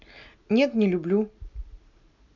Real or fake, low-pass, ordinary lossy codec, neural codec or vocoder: real; 7.2 kHz; none; none